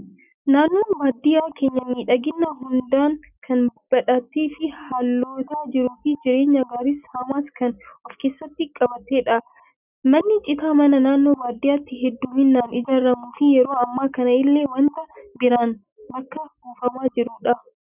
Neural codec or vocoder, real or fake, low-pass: none; real; 3.6 kHz